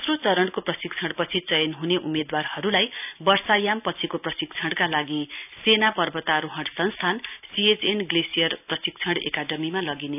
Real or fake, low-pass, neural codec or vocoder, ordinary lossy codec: real; 3.6 kHz; none; none